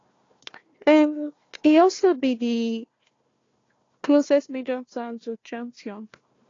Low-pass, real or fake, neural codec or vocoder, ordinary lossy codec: 7.2 kHz; fake; codec, 16 kHz, 1 kbps, FunCodec, trained on Chinese and English, 50 frames a second; AAC, 32 kbps